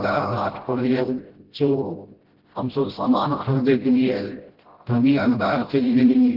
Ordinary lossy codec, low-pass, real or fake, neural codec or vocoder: Opus, 16 kbps; 5.4 kHz; fake; codec, 16 kHz, 0.5 kbps, FreqCodec, smaller model